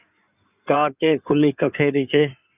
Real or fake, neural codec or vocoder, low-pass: fake; codec, 16 kHz in and 24 kHz out, 2.2 kbps, FireRedTTS-2 codec; 3.6 kHz